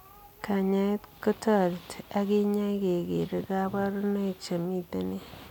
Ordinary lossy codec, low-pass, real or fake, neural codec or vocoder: none; 19.8 kHz; real; none